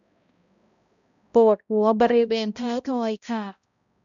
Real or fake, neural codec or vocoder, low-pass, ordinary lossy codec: fake; codec, 16 kHz, 0.5 kbps, X-Codec, HuBERT features, trained on balanced general audio; 7.2 kHz; none